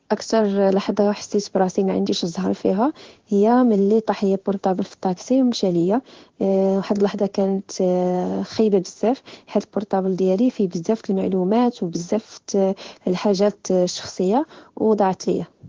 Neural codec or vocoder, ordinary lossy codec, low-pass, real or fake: codec, 16 kHz in and 24 kHz out, 1 kbps, XY-Tokenizer; Opus, 16 kbps; 7.2 kHz; fake